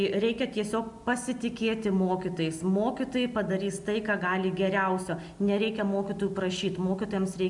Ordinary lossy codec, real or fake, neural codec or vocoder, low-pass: MP3, 96 kbps; real; none; 10.8 kHz